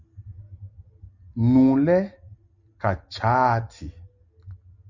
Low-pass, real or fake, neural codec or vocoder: 7.2 kHz; real; none